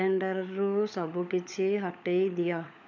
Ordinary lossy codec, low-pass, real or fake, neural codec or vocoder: none; 7.2 kHz; fake; codec, 16 kHz, 16 kbps, FunCodec, trained on LibriTTS, 50 frames a second